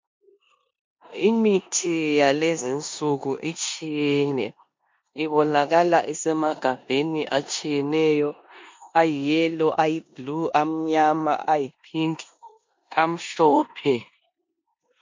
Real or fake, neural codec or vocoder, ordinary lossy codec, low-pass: fake; codec, 16 kHz in and 24 kHz out, 0.9 kbps, LongCat-Audio-Codec, four codebook decoder; MP3, 48 kbps; 7.2 kHz